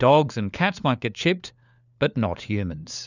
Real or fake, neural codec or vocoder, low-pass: fake; codec, 16 kHz, 4 kbps, FunCodec, trained on LibriTTS, 50 frames a second; 7.2 kHz